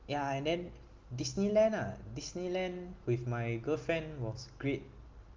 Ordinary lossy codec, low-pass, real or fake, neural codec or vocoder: Opus, 32 kbps; 7.2 kHz; real; none